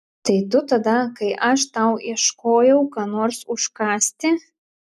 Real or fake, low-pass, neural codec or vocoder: real; 14.4 kHz; none